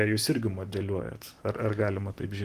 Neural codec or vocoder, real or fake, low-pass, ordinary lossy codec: none; real; 14.4 kHz; Opus, 24 kbps